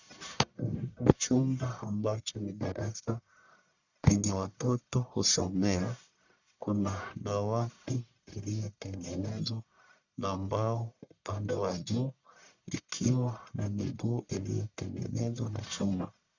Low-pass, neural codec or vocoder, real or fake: 7.2 kHz; codec, 44.1 kHz, 1.7 kbps, Pupu-Codec; fake